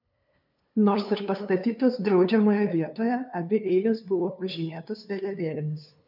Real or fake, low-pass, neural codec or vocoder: fake; 5.4 kHz; codec, 16 kHz, 2 kbps, FunCodec, trained on LibriTTS, 25 frames a second